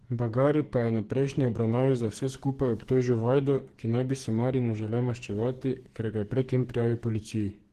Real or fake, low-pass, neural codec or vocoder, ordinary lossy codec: fake; 14.4 kHz; codec, 44.1 kHz, 2.6 kbps, SNAC; Opus, 16 kbps